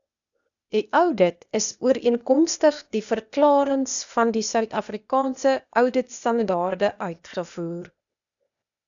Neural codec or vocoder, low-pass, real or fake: codec, 16 kHz, 0.8 kbps, ZipCodec; 7.2 kHz; fake